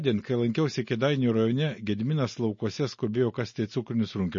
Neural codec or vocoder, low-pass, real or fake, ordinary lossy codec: none; 7.2 kHz; real; MP3, 32 kbps